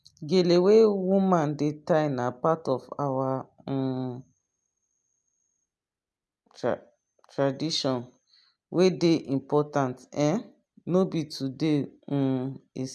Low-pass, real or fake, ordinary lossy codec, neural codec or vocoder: none; real; none; none